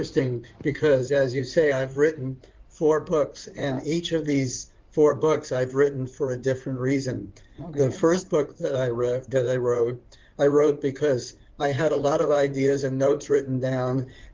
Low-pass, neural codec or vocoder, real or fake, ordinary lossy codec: 7.2 kHz; codec, 16 kHz in and 24 kHz out, 2.2 kbps, FireRedTTS-2 codec; fake; Opus, 32 kbps